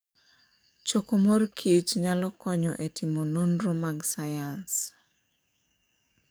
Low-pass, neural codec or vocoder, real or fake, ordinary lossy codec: none; codec, 44.1 kHz, 7.8 kbps, DAC; fake; none